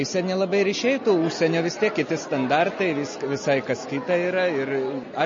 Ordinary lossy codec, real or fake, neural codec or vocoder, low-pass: MP3, 32 kbps; real; none; 7.2 kHz